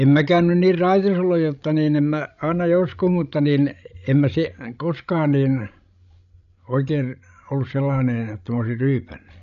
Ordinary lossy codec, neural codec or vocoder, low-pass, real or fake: none; codec, 16 kHz, 16 kbps, FreqCodec, larger model; 7.2 kHz; fake